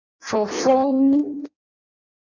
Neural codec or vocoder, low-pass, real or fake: codec, 16 kHz in and 24 kHz out, 0.6 kbps, FireRedTTS-2 codec; 7.2 kHz; fake